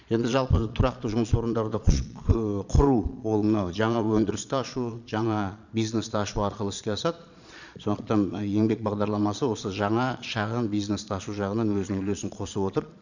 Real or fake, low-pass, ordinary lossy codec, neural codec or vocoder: fake; 7.2 kHz; none; vocoder, 22.05 kHz, 80 mel bands, Vocos